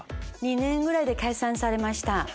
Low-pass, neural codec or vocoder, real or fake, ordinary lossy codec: none; none; real; none